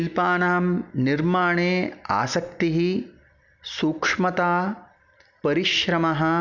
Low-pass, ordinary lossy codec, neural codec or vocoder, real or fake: 7.2 kHz; none; none; real